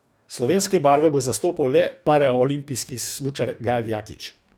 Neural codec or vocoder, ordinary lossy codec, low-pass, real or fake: codec, 44.1 kHz, 2.6 kbps, DAC; none; none; fake